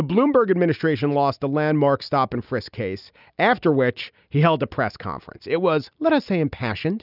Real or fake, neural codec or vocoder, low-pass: real; none; 5.4 kHz